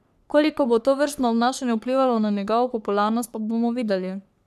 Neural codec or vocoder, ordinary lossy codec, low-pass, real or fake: codec, 44.1 kHz, 3.4 kbps, Pupu-Codec; none; 14.4 kHz; fake